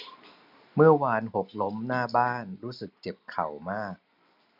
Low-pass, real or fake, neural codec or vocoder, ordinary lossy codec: 5.4 kHz; real; none; none